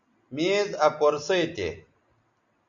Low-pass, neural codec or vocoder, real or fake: 7.2 kHz; none; real